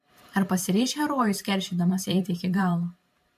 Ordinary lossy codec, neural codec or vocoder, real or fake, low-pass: MP3, 64 kbps; vocoder, 44.1 kHz, 128 mel bands every 512 samples, BigVGAN v2; fake; 14.4 kHz